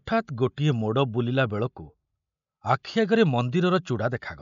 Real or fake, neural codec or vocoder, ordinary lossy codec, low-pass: real; none; none; 7.2 kHz